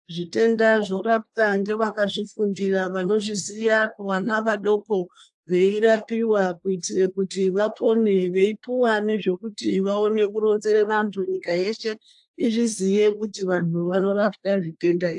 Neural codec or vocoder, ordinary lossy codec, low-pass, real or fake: codec, 24 kHz, 1 kbps, SNAC; AAC, 64 kbps; 10.8 kHz; fake